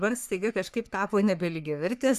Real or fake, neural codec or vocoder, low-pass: fake; codec, 44.1 kHz, 3.4 kbps, Pupu-Codec; 14.4 kHz